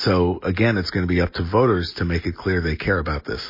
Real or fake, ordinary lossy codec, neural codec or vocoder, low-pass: real; MP3, 24 kbps; none; 5.4 kHz